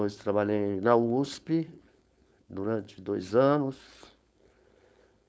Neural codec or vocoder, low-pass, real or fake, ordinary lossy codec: codec, 16 kHz, 4.8 kbps, FACodec; none; fake; none